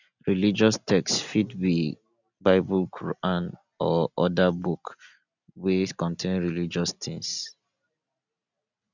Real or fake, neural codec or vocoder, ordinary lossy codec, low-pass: real; none; none; 7.2 kHz